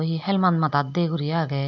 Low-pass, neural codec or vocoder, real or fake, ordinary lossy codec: 7.2 kHz; none; real; none